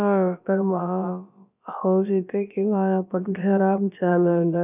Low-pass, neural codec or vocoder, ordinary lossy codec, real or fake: 3.6 kHz; codec, 16 kHz, about 1 kbps, DyCAST, with the encoder's durations; none; fake